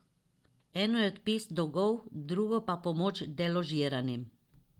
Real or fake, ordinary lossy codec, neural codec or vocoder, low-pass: real; Opus, 24 kbps; none; 19.8 kHz